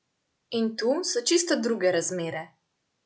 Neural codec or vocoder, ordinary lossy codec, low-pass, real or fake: none; none; none; real